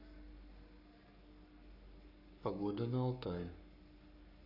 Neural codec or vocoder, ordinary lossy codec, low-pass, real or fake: codec, 44.1 kHz, 7.8 kbps, Pupu-Codec; none; 5.4 kHz; fake